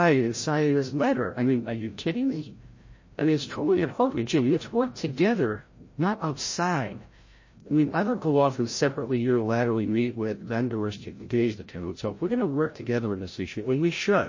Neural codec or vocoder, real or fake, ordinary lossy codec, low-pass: codec, 16 kHz, 0.5 kbps, FreqCodec, larger model; fake; MP3, 32 kbps; 7.2 kHz